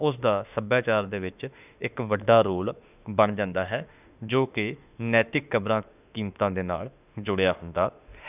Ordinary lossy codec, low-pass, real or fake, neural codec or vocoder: none; 3.6 kHz; fake; autoencoder, 48 kHz, 32 numbers a frame, DAC-VAE, trained on Japanese speech